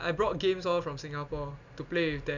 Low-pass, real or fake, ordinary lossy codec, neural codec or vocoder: 7.2 kHz; real; none; none